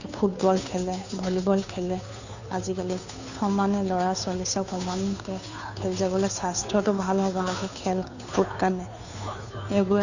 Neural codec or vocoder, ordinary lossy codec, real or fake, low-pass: codec, 16 kHz, 2 kbps, FunCodec, trained on Chinese and English, 25 frames a second; none; fake; 7.2 kHz